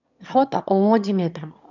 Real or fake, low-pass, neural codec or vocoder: fake; 7.2 kHz; autoencoder, 22.05 kHz, a latent of 192 numbers a frame, VITS, trained on one speaker